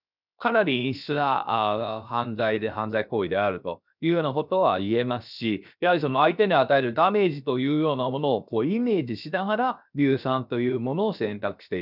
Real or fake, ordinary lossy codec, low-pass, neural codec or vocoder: fake; none; 5.4 kHz; codec, 16 kHz, 0.7 kbps, FocalCodec